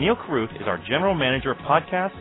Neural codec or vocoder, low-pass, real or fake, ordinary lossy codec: none; 7.2 kHz; real; AAC, 16 kbps